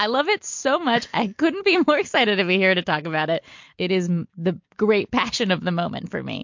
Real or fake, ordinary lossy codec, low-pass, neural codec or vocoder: real; MP3, 48 kbps; 7.2 kHz; none